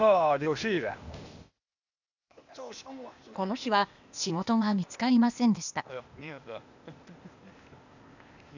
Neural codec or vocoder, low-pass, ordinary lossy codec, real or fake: codec, 16 kHz, 0.8 kbps, ZipCodec; 7.2 kHz; none; fake